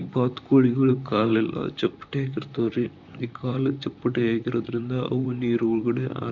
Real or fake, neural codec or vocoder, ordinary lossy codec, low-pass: fake; vocoder, 44.1 kHz, 128 mel bands, Pupu-Vocoder; none; 7.2 kHz